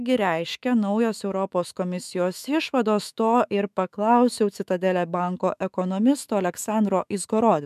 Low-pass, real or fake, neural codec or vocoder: 14.4 kHz; fake; autoencoder, 48 kHz, 128 numbers a frame, DAC-VAE, trained on Japanese speech